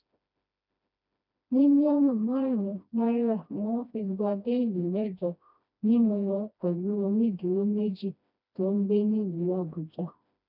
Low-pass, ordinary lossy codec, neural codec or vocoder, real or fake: 5.4 kHz; none; codec, 16 kHz, 1 kbps, FreqCodec, smaller model; fake